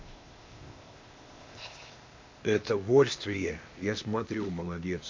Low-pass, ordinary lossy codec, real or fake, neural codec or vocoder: 7.2 kHz; MP3, 48 kbps; fake; codec, 16 kHz, 0.8 kbps, ZipCodec